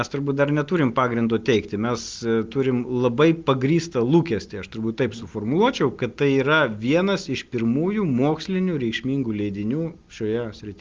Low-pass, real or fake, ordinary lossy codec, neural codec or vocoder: 7.2 kHz; real; Opus, 32 kbps; none